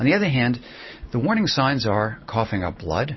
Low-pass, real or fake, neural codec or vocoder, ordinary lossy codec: 7.2 kHz; real; none; MP3, 24 kbps